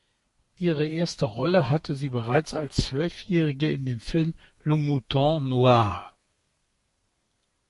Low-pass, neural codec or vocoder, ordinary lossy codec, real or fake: 14.4 kHz; codec, 32 kHz, 1.9 kbps, SNAC; MP3, 48 kbps; fake